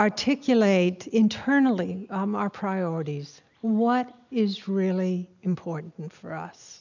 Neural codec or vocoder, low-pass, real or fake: none; 7.2 kHz; real